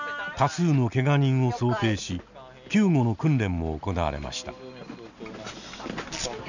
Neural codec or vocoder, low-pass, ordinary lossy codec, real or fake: none; 7.2 kHz; none; real